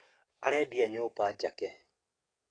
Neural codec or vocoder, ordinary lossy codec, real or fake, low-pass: codec, 44.1 kHz, 7.8 kbps, DAC; AAC, 32 kbps; fake; 9.9 kHz